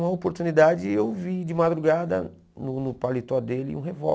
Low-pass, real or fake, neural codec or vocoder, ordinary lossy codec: none; real; none; none